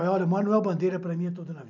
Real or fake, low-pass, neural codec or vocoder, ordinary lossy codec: real; 7.2 kHz; none; none